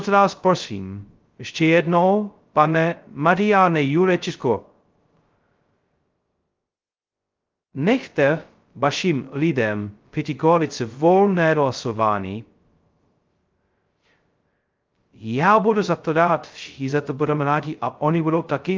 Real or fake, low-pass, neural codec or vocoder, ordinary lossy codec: fake; 7.2 kHz; codec, 16 kHz, 0.2 kbps, FocalCodec; Opus, 32 kbps